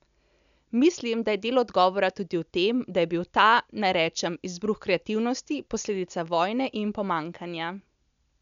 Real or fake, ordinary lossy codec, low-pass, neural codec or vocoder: real; none; 7.2 kHz; none